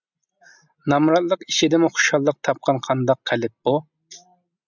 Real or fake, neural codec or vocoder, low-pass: real; none; 7.2 kHz